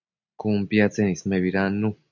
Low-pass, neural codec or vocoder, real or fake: 7.2 kHz; none; real